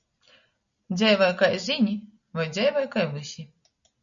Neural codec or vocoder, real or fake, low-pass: none; real; 7.2 kHz